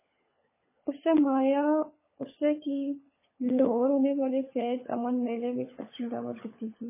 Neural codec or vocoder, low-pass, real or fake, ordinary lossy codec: codec, 16 kHz, 16 kbps, FunCodec, trained on LibriTTS, 50 frames a second; 3.6 kHz; fake; MP3, 16 kbps